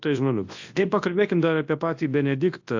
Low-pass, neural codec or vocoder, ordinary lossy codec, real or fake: 7.2 kHz; codec, 24 kHz, 0.9 kbps, WavTokenizer, large speech release; AAC, 48 kbps; fake